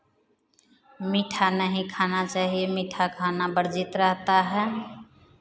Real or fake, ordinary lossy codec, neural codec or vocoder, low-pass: real; none; none; none